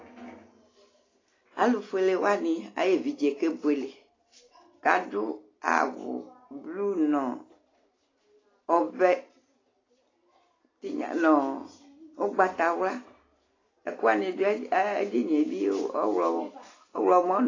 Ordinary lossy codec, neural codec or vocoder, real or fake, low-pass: AAC, 32 kbps; none; real; 7.2 kHz